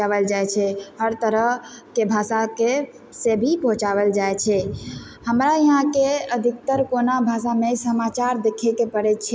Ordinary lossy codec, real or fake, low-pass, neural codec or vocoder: none; real; none; none